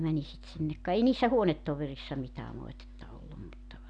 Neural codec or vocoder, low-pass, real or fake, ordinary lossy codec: none; 9.9 kHz; real; none